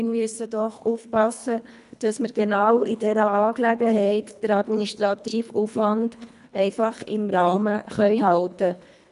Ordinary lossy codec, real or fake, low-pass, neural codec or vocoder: none; fake; 10.8 kHz; codec, 24 kHz, 1.5 kbps, HILCodec